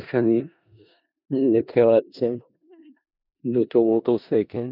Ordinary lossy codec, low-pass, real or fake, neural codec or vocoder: none; 5.4 kHz; fake; codec, 16 kHz in and 24 kHz out, 0.4 kbps, LongCat-Audio-Codec, four codebook decoder